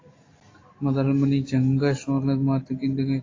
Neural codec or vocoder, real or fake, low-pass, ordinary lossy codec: none; real; 7.2 kHz; AAC, 32 kbps